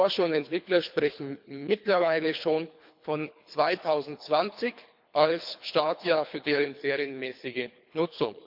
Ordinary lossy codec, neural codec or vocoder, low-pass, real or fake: MP3, 48 kbps; codec, 24 kHz, 3 kbps, HILCodec; 5.4 kHz; fake